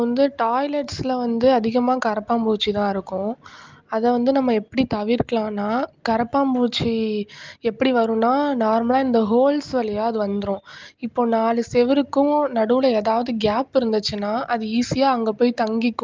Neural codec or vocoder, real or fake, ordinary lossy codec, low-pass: none; real; Opus, 32 kbps; 7.2 kHz